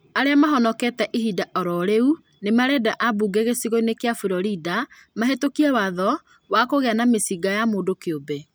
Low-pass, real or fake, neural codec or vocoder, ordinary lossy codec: none; real; none; none